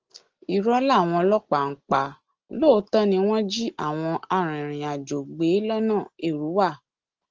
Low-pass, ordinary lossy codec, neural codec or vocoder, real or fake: 7.2 kHz; Opus, 16 kbps; none; real